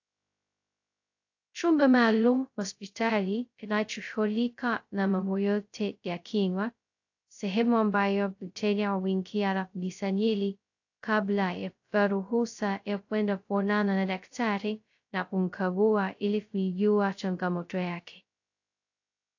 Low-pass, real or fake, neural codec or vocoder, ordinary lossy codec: 7.2 kHz; fake; codec, 16 kHz, 0.2 kbps, FocalCodec; AAC, 48 kbps